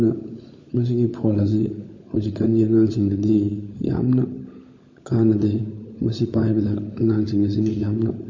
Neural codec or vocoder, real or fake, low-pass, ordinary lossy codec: codec, 16 kHz, 16 kbps, FunCodec, trained on LibriTTS, 50 frames a second; fake; 7.2 kHz; MP3, 32 kbps